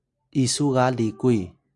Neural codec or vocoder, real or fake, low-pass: none; real; 10.8 kHz